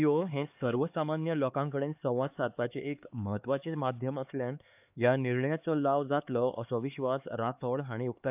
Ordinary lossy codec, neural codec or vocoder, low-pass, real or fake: AAC, 32 kbps; codec, 16 kHz, 4 kbps, X-Codec, HuBERT features, trained on LibriSpeech; 3.6 kHz; fake